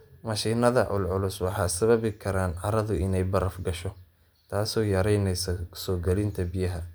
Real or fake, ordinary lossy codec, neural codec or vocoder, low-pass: real; none; none; none